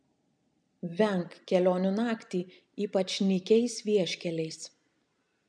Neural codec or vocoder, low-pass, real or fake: none; 9.9 kHz; real